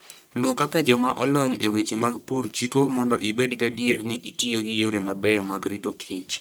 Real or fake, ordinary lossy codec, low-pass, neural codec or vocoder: fake; none; none; codec, 44.1 kHz, 1.7 kbps, Pupu-Codec